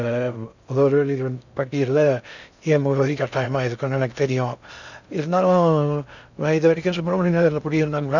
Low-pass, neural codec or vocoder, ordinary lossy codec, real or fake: 7.2 kHz; codec, 16 kHz in and 24 kHz out, 0.6 kbps, FocalCodec, streaming, 2048 codes; none; fake